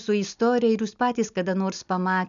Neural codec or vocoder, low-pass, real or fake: none; 7.2 kHz; real